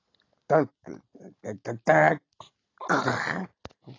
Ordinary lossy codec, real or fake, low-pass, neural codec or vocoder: AAC, 32 kbps; real; 7.2 kHz; none